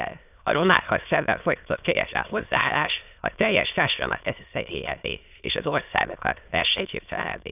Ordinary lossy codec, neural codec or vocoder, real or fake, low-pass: none; autoencoder, 22.05 kHz, a latent of 192 numbers a frame, VITS, trained on many speakers; fake; 3.6 kHz